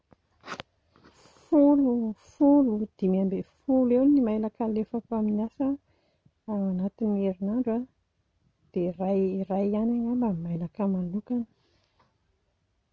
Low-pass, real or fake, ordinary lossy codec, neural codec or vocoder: none; real; none; none